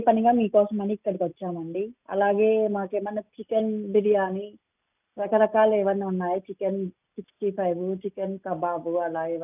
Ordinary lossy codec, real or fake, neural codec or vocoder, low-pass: none; real; none; 3.6 kHz